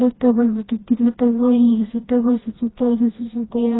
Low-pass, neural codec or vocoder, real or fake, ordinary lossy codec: 7.2 kHz; codec, 16 kHz, 1 kbps, FreqCodec, smaller model; fake; AAC, 16 kbps